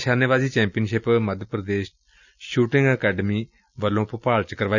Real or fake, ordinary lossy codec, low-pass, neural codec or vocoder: real; none; 7.2 kHz; none